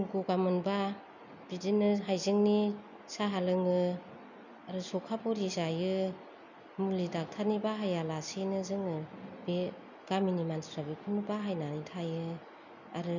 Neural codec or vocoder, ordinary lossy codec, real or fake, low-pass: none; none; real; 7.2 kHz